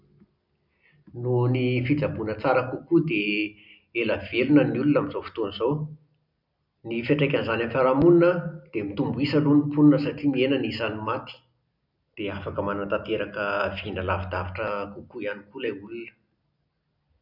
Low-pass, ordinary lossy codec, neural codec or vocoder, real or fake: 5.4 kHz; none; none; real